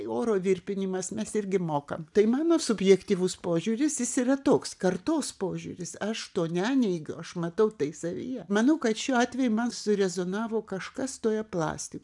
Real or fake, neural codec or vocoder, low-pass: real; none; 10.8 kHz